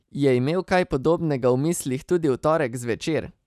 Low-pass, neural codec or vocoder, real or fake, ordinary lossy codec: 14.4 kHz; none; real; none